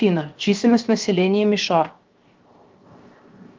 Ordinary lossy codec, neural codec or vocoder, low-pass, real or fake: Opus, 32 kbps; codec, 16 kHz, 0.7 kbps, FocalCodec; 7.2 kHz; fake